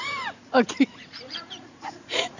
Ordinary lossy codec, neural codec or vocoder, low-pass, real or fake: none; vocoder, 44.1 kHz, 80 mel bands, Vocos; 7.2 kHz; fake